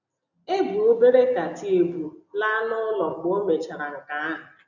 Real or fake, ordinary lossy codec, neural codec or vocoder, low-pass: real; none; none; 7.2 kHz